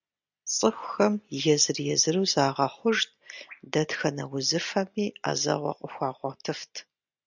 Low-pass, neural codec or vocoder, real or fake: 7.2 kHz; none; real